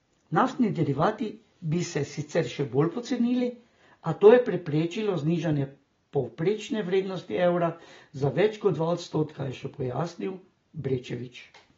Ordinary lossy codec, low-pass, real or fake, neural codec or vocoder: AAC, 24 kbps; 7.2 kHz; real; none